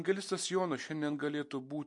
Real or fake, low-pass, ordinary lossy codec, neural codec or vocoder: real; 10.8 kHz; MP3, 48 kbps; none